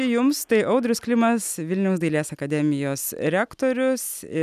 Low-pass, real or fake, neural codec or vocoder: 14.4 kHz; real; none